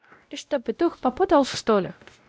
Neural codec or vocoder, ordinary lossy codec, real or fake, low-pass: codec, 16 kHz, 0.5 kbps, X-Codec, WavLM features, trained on Multilingual LibriSpeech; none; fake; none